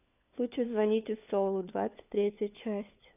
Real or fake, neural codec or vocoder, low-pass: fake; codec, 16 kHz, 4 kbps, FunCodec, trained on LibriTTS, 50 frames a second; 3.6 kHz